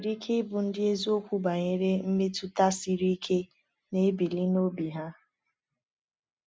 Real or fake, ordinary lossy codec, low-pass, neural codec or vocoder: real; none; none; none